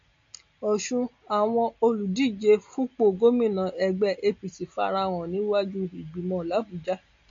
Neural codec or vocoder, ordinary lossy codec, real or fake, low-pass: none; MP3, 48 kbps; real; 7.2 kHz